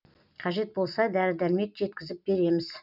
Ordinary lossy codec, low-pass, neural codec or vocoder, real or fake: none; 5.4 kHz; none; real